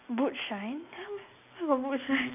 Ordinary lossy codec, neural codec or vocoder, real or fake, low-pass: none; none; real; 3.6 kHz